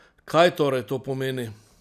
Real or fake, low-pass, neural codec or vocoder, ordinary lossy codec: real; 14.4 kHz; none; none